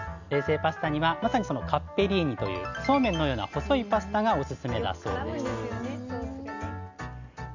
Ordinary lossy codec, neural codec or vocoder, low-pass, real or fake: none; none; 7.2 kHz; real